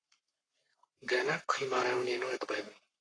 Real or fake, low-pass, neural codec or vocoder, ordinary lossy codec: fake; 9.9 kHz; codec, 44.1 kHz, 7.8 kbps, Pupu-Codec; AAC, 32 kbps